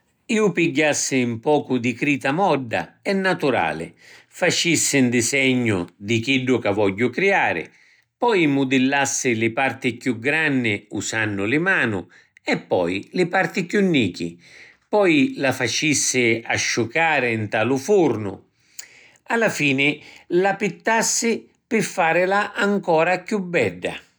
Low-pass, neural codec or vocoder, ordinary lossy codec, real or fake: none; none; none; real